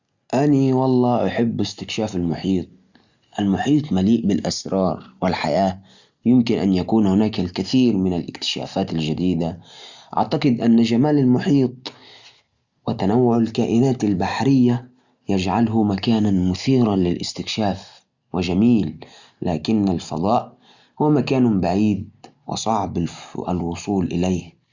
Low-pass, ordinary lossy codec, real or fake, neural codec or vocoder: 7.2 kHz; Opus, 64 kbps; real; none